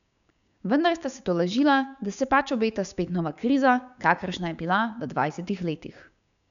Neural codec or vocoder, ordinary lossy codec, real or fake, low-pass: codec, 16 kHz, 6 kbps, DAC; none; fake; 7.2 kHz